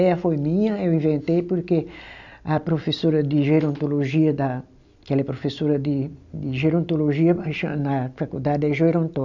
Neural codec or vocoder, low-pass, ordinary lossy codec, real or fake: none; 7.2 kHz; none; real